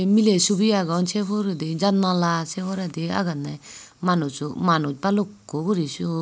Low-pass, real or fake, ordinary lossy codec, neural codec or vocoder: none; real; none; none